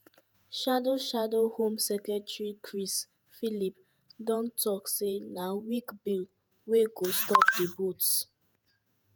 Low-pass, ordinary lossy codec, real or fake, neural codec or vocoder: none; none; fake; vocoder, 48 kHz, 128 mel bands, Vocos